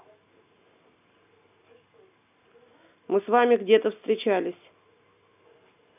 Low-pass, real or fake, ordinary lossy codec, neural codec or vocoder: 3.6 kHz; real; none; none